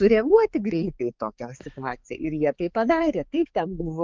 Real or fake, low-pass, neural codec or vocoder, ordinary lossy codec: fake; 7.2 kHz; codec, 16 kHz, 4 kbps, X-Codec, HuBERT features, trained on balanced general audio; Opus, 32 kbps